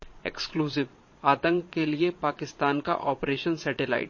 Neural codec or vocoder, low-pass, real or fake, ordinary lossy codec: vocoder, 22.05 kHz, 80 mel bands, Vocos; 7.2 kHz; fake; MP3, 32 kbps